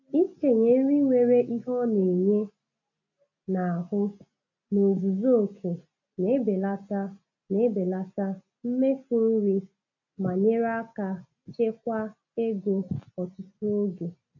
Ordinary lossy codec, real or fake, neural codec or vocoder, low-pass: none; real; none; 7.2 kHz